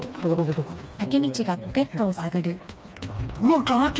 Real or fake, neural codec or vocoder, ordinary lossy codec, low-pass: fake; codec, 16 kHz, 2 kbps, FreqCodec, smaller model; none; none